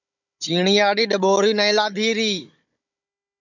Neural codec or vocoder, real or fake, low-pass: codec, 16 kHz, 16 kbps, FunCodec, trained on Chinese and English, 50 frames a second; fake; 7.2 kHz